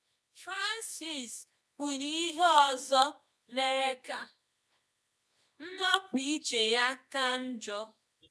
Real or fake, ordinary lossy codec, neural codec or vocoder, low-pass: fake; none; codec, 24 kHz, 0.9 kbps, WavTokenizer, medium music audio release; none